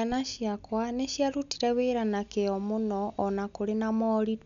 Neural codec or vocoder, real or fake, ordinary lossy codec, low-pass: none; real; none; 7.2 kHz